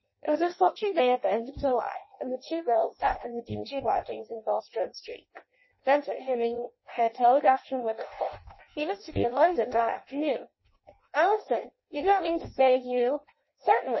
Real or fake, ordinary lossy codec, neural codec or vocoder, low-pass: fake; MP3, 24 kbps; codec, 16 kHz in and 24 kHz out, 0.6 kbps, FireRedTTS-2 codec; 7.2 kHz